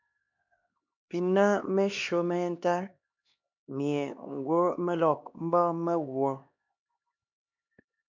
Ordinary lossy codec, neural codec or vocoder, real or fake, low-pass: MP3, 64 kbps; codec, 16 kHz, 2 kbps, X-Codec, HuBERT features, trained on LibriSpeech; fake; 7.2 kHz